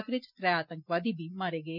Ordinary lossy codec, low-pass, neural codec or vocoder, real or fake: none; 5.4 kHz; none; real